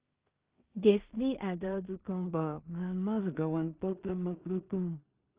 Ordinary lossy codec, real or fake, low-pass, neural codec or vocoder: Opus, 32 kbps; fake; 3.6 kHz; codec, 16 kHz in and 24 kHz out, 0.4 kbps, LongCat-Audio-Codec, two codebook decoder